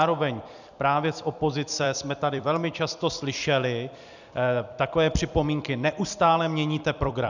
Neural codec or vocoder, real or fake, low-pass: vocoder, 44.1 kHz, 128 mel bands every 256 samples, BigVGAN v2; fake; 7.2 kHz